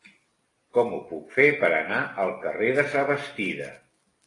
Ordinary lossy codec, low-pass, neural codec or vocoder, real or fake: AAC, 32 kbps; 10.8 kHz; none; real